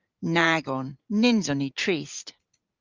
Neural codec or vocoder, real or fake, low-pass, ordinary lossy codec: vocoder, 44.1 kHz, 80 mel bands, Vocos; fake; 7.2 kHz; Opus, 32 kbps